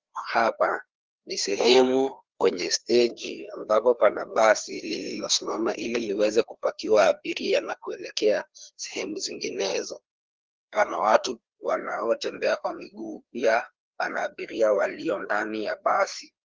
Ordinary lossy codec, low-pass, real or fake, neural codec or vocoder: Opus, 16 kbps; 7.2 kHz; fake; codec, 16 kHz, 2 kbps, FreqCodec, larger model